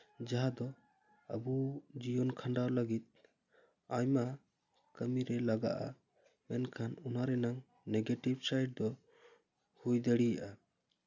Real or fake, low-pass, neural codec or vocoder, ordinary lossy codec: real; 7.2 kHz; none; none